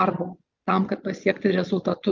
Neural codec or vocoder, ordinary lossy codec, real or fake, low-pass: none; Opus, 24 kbps; real; 7.2 kHz